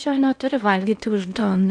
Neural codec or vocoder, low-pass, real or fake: codec, 16 kHz in and 24 kHz out, 0.8 kbps, FocalCodec, streaming, 65536 codes; 9.9 kHz; fake